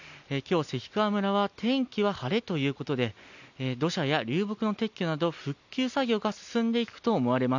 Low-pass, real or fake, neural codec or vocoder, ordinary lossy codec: 7.2 kHz; real; none; none